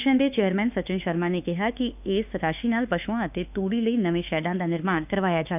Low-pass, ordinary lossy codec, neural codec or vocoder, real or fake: 3.6 kHz; none; autoencoder, 48 kHz, 32 numbers a frame, DAC-VAE, trained on Japanese speech; fake